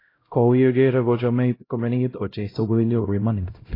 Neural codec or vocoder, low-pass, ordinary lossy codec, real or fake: codec, 16 kHz, 0.5 kbps, X-Codec, HuBERT features, trained on LibriSpeech; 5.4 kHz; AAC, 24 kbps; fake